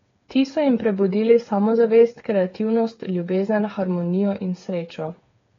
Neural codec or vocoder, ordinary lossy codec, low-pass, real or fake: codec, 16 kHz, 8 kbps, FreqCodec, smaller model; AAC, 32 kbps; 7.2 kHz; fake